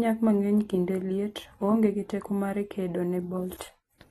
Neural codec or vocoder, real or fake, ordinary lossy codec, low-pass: none; real; AAC, 32 kbps; 19.8 kHz